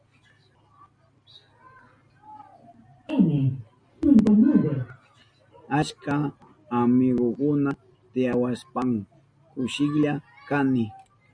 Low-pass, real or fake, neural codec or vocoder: 9.9 kHz; real; none